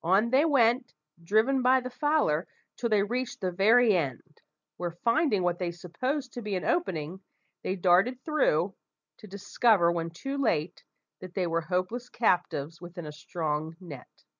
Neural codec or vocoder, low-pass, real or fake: none; 7.2 kHz; real